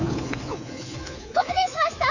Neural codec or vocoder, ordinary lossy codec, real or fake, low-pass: codec, 24 kHz, 3.1 kbps, DualCodec; none; fake; 7.2 kHz